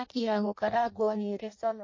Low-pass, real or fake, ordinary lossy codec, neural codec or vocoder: 7.2 kHz; fake; MP3, 32 kbps; codec, 16 kHz in and 24 kHz out, 0.6 kbps, FireRedTTS-2 codec